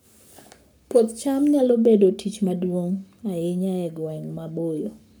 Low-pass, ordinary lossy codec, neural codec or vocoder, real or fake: none; none; codec, 44.1 kHz, 7.8 kbps, Pupu-Codec; fake